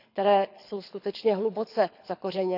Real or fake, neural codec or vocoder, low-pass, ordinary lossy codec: fake; codec, 24 kHz, 6 kbps, HILCodec; 5.4 kHz; none